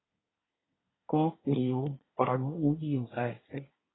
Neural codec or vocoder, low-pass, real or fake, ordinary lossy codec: codec, 24 kHz, 1 kbps, SNAC; 7.2 kHz; fake; AAC, 16 kbps